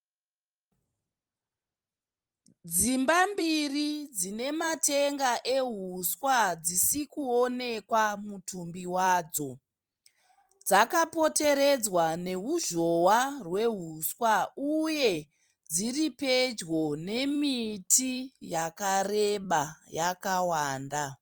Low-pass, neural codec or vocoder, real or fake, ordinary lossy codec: 19.8 kHz; vocoder, 44.1 kHz, 128 mel bands every 256 samples, BigVGAN v2; fake; Opus, 32 kbps